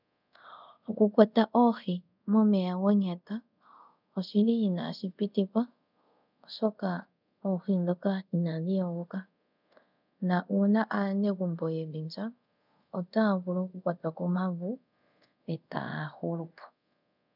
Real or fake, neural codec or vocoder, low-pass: fake; codec, 24 kHz, 0.5 kbps, DualCodec; 5.4 kHz